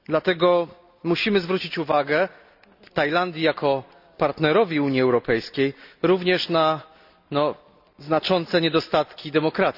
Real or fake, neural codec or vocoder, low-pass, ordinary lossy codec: real; none; 5.4 kHz; none